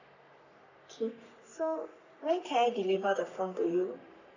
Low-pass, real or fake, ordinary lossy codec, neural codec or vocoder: 7.2 kHz; fake; none; codec, 44.1 kHz, 3.4 kbps, Pupu-Codec